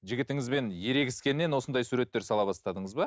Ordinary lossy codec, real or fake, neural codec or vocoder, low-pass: none; real; none; none